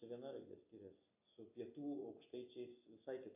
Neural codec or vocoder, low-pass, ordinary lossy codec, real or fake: none; 3.6 kHz; AAC, 32 kbps; real